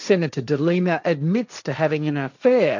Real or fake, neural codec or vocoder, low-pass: fake; codec, 16 kHz, 1.1 kbps, Voila-Tokenizer; 7.2 kHz